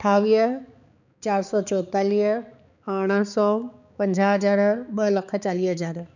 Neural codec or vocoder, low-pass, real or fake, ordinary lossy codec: codec, 16 kHz, 4 kbps, X-Codec, HuBERT features, trained on balanced general audio; 7.2 kHz; fake; none